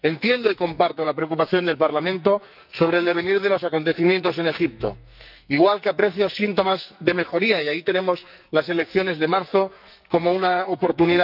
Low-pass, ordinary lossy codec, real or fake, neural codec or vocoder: 5.4 kHz; none; fake; codec, 44.1 kHz, 2.6 kbps, SNAC